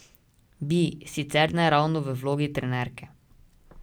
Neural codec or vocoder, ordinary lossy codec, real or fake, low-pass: none; none; real; none